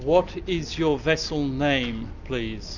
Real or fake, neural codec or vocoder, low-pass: real; none; 7.2 kHz